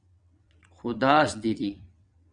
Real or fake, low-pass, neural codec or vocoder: fake; 9.9 kHz; vocoder, 22.05 kHz, 80 mel bands, WaveNeXt